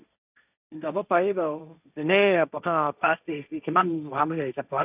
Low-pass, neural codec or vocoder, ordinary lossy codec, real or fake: 3.6 kHz; codec, 16 kHz, 1.1 kbps, Voila-Tokenizer; none; fake